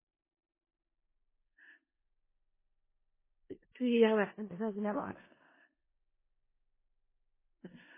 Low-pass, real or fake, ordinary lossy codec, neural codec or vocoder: 3.6 kHz; fake; MP3, 16 kbps; codec, 16 kHz in and 24 kHz out, 0.4 kbps, LongCat-Audio-Codec, four codebook decoder